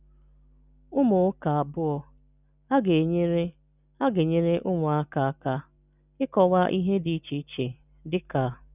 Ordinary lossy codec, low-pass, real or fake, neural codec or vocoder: none; 3.6 kHz; real; none